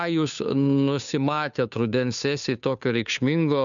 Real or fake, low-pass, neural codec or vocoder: fake; 7.2 kHz; codec, 16 kHz, 2 kbps, FunCodec, trained on Chinese and English, 25 frames a second